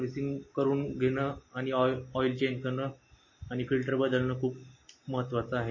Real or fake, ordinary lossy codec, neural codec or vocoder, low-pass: real; MP3, 32 kbps; none; 7.2 kHz